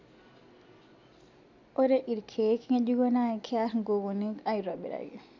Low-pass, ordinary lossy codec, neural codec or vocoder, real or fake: 7.2 kHz; MP3, 64 kbps; none; real